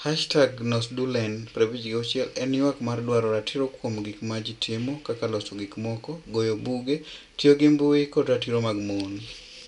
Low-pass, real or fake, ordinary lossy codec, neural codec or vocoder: 10.8 kHz; real; none; none